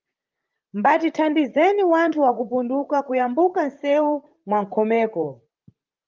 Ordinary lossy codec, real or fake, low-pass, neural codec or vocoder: Opus, 32 kbps; fake; 7.2 kHz; vocoder, 44.1 kHz, 128 mel bands, Pupu-Vocoder